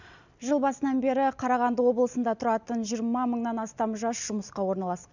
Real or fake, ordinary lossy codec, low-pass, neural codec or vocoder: real; none; 7.2 kHz; none